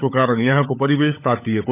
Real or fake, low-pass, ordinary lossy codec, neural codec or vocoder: fake; 3.6 kHz; none; codec, 16 kHz, 16 kbps, FunCodec, trained on LibriTTS, 50 frames a second